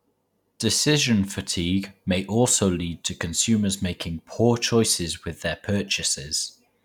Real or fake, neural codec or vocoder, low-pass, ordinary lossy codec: real; none; 19.8 kHz; none